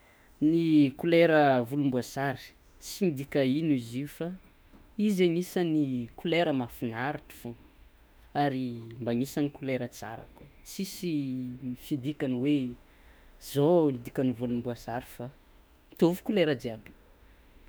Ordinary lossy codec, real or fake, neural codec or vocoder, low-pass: none; fake; autoencoder, 48 kHz, 32 numbers a frame, DAC-VAE, trained on Japanese speech; none